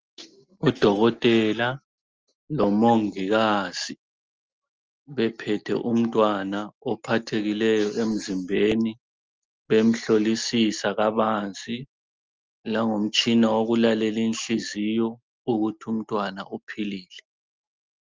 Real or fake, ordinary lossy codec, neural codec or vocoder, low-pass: real; Opus, 24 kbps; none; 7.2 kHz